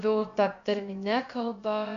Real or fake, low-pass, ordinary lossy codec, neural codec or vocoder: fake; 7.2 kHz; MP3, 64 kbps; codec, 16 kHz, about 1 kbps, DyCAST, with the encoder's durations